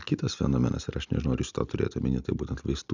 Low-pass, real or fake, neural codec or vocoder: 7.2 kHz; real; none